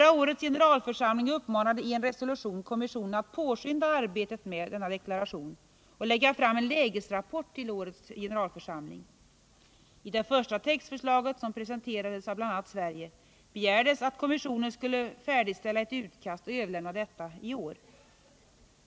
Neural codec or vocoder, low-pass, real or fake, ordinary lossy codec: none; none; real; none